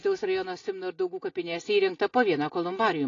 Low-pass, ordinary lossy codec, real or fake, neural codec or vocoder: 7.2 kHz; AAC, 32 kbps; real; none